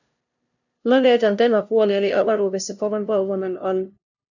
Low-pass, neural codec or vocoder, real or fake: 7.2 kHz; codec, 16 kHz, 0.5 kbps, FunCodec, trained on LibriTTS, 25 frames a second; fake